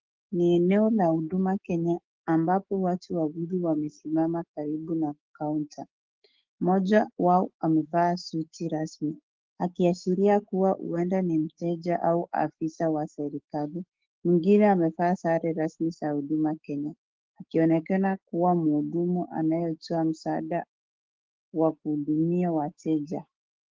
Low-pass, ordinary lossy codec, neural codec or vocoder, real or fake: 7.2 kHz; Opus, 16 kbps; autoencoder, 48 kHz, 128 numbers a frame, DAC-VAE, trained on Japanese speech; fake